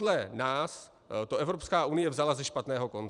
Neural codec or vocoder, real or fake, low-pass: vocoder, 44.1 kHz, 128 mel bands every 512 samples, BigVGAN v2; fake; 10.8 kHz